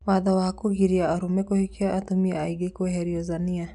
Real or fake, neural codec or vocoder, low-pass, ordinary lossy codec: real; none; 10.8 kHz; none